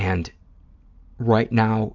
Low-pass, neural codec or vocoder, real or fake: 7.2 kHz; none; real